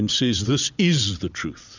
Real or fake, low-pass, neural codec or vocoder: fake; 7.2 kHz; vocoder, 22.05 kHz, 80 mel bands, WaveNeXt